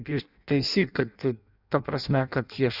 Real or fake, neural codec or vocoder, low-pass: fake; codec, 16 kHz in and 24 kHz out, 0.6 kbps, FireRedTTS-2 codec; 5.4 kHz